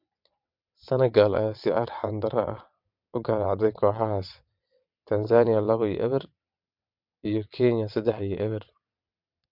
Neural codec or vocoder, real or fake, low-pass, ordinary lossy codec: vocoder, 22.05 kHz, 80 mel bands, WaveNeXt; fake; 5.4 kHz; MP3, 48 kbps